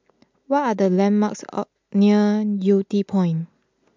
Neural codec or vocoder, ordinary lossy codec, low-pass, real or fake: none; MP3, 64 kbps; 7.2 kHz; real